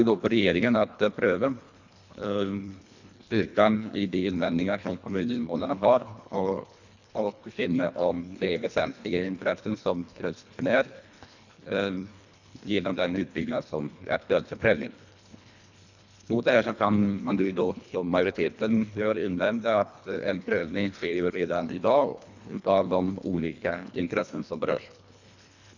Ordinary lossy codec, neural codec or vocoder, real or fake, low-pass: none; codec, 24 kHz, 1.5 kbps, HILCodec; fake; 7.2 kHz